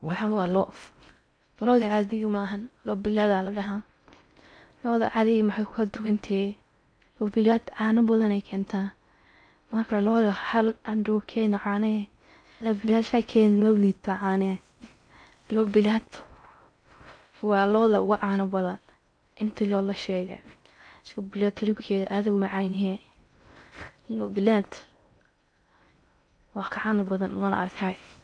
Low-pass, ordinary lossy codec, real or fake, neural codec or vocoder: 9.9 kHz; none; fake; codec, 16 kHz in and 24 kHz out, 0.6 kbps, FocalCodec, streaming, 4096 codes